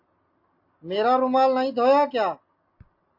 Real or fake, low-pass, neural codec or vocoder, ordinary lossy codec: real; 5.4 kHz; none; MP3, 32 kbps